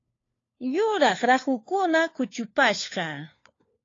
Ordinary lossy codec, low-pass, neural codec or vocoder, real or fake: AAC, 32 kbps; 7.2 kHz; codec, 16 kHz, 4 kbps, FunCodec, trained on LibriTTS, 50 frames a second; fake